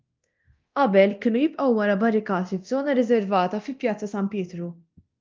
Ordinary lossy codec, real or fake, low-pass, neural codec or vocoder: Opus, 24 kbps; fake; 7.2 kHz; codec, 24 kHz, 0.9 kbps, DualCodec